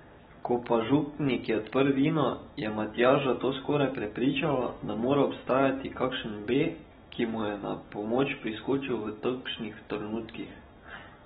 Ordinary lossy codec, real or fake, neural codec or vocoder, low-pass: AAC, 16 kbps; real; none; 7.2 kHz